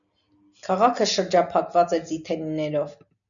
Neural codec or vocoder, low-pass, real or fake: none; 7.2 kHz; real